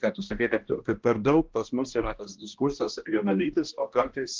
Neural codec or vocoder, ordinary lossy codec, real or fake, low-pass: codec, 16 kHz, 1 kbps, X-Codec, HuBERT features, trained on balanced general audio; Opus, 16 kbps; fake; 7.2 kHz